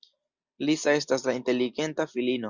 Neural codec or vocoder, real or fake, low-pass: none; real; 7.2 kHz